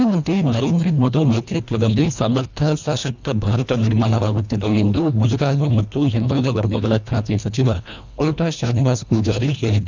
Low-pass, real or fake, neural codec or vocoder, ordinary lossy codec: 7.2 kHz; fake; codec, 24 kHz, 1.5 kbps, HILCodec; none